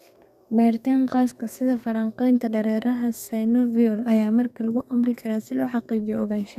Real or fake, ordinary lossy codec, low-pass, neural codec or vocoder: fake; none; 14.4 kHz; codec, 32 kHz, 1.9 kbps, SNAC